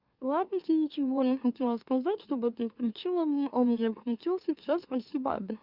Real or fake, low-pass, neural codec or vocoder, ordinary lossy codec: fake; 5.4 kHz; autoencoder, 44.1 kHz, a latent of 192 numbers a frame, MeloTTS; Opus, 64 kbps